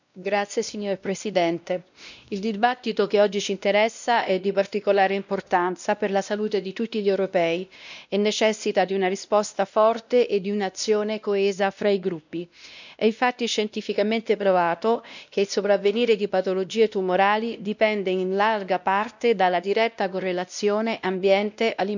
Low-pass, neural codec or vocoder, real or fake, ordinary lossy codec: 7.2 kHz; codec, 16 kHz, 1 kbps, X-Codec, WavLM features, trained on Multilingual LibriSpeech; fake; none